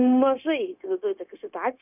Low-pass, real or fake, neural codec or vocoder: 3.6 kHz; fake; codec, 16 kHz, 0.9 kbps, LongCat-Audio-Codec